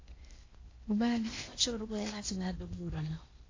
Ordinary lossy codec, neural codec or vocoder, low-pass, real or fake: AAC, 32 kbps; codec, 16 kHz in and 24 kHz out, 0.8 kbps, FocalCodec, streaming, 65536 codes; 7.2 kHz; fake